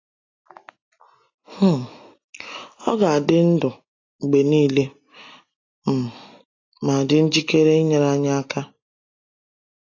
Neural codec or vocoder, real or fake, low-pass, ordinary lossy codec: none; real; 7.2 kHz; AAC, 32 kbps